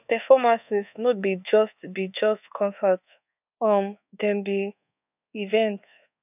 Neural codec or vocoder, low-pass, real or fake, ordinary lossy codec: codec, 24 kHz, 1.2 kbps, DualCodec; 3.6 kHz; fake; none